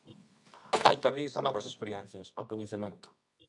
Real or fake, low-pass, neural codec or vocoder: fake; 10.8 kHz; codec, 24 kHz, 0.9 kbps, WavTokenizer, medium music audio release